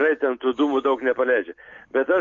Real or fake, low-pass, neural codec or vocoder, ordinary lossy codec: real; 7.2 kHz; none; MP3, 48 kbps